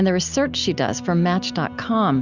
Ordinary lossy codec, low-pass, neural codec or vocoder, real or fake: Opus, 64 kbps; 7.2 kHz; none; real